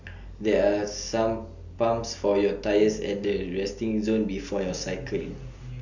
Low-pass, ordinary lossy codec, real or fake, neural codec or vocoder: 7.2 kHz; none; real; none